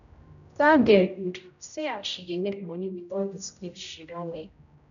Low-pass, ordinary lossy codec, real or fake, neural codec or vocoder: 7.2 kHz; none; fake; codec, 16 kHz, 0.5 kbps, X-Codec, HuBERT features, trained on general audio